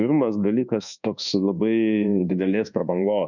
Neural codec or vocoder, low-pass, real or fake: codec, 24 kHz, 1.2 kbps, DualCodec; 7.2 kHz; fake